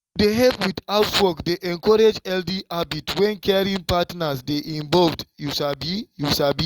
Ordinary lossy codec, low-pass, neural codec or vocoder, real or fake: Opus, 32 kbps; 19.8 kHz; none; real